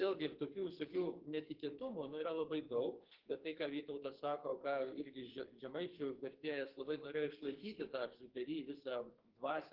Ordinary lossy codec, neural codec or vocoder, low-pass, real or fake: Opus, 16 kbps; codec, 44.1 kHz, 2.6 kbps, SNAC; 5.4 kHz; fake